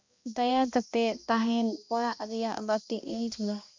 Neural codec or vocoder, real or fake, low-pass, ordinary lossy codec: codec, 16 kHz, 1 kbps, X-Codec, HuBERT features, trained on balanced general audio; fake; 7.2 kHz; MP3, 64 kbps